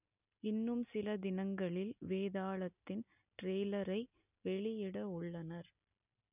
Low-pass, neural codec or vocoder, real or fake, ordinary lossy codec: 3.6 kHz; none; real; none